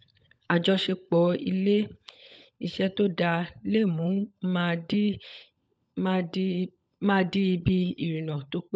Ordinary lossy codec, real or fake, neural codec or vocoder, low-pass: none; fake; codec, 16 kHz, 16 kbps, FunCodec, trained on LibriTTS, 50 frames a second; none